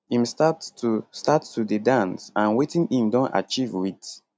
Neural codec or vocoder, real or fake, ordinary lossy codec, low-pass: none; real; none; none